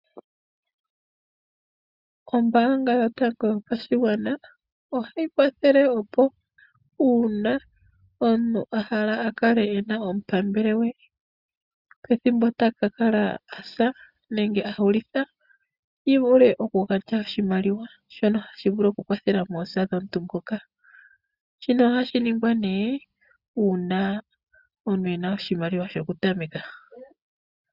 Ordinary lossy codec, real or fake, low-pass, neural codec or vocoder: Opus, 64 kbps; real; 5.4 kHz; none